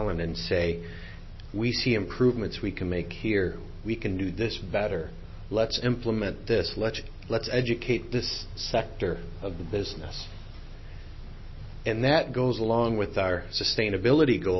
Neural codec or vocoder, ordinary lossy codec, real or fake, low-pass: none; MP3, 24 kbps; real; 7.2 kHz